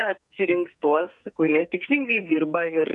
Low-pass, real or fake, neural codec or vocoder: 10.8 kHz; fake; codec, 44.1 kHz, 2.6 kbps, SNAC